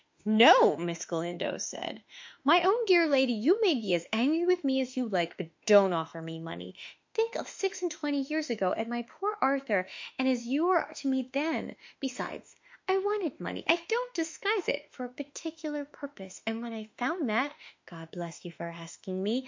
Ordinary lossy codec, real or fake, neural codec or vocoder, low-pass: MP3, 48 kbps; fake; autoencoder, 48 kHz, 32 numbers a frame, DAC-VAE, trained on Japanese speech; 7.2 kHz